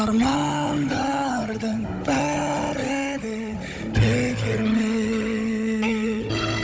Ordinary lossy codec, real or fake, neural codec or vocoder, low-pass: none; fake; codec, 16 kHz, 16 kbps, FunCodec, trained on Chinese and English, 50 frames a second; none